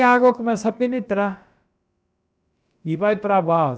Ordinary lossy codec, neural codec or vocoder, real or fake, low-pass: none; codec, 16 kHz, about 1 kbps, DyCAST, with the encoder's durations; fake; none